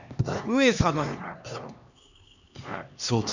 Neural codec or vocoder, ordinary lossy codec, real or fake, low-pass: codec, 16 kHz, 2 kbps, X-Codec, HuBERT features, trained on LibriSpeech; none; fake; 7.2 kHz